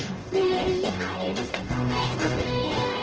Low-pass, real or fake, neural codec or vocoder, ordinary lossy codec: 7.2 kHz; fake; codec, 44.1 kHz, 0.9 kbps, DAC; Opus, 16 kbps